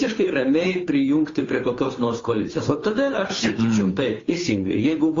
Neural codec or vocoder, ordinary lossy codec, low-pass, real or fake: codec, 16 kHz, 2 kbps, FunCodec, trained on Chinese and English, 25 frames a second; AAC, 32 kbps; 7.2 kHz; fake